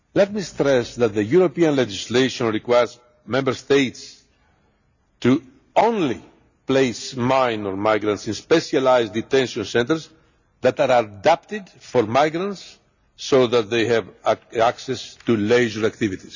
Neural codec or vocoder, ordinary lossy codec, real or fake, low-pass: none; none; real; 7.2 kHz